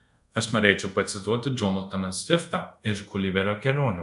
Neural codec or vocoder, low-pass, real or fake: codec, 24 kHz, 0.5 kbps, DualCodec; 10.8 kHz; fake